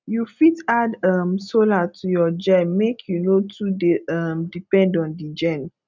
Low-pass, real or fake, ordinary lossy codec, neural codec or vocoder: 7.2 kHz; real; none; none